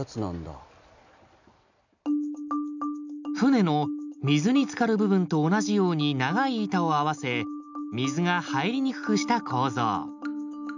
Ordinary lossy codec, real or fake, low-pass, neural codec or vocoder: none; real; 7.2 kHz; none